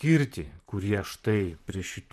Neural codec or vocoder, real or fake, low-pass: vocoder, 44.1 kHz, 128 mel bands, Pupu-Vocoder; fake; 14.4 kHz